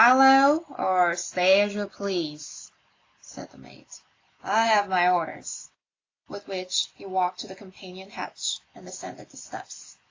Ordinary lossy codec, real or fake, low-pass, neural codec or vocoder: AAC, 32 kbps; real; 7.2 kHz; none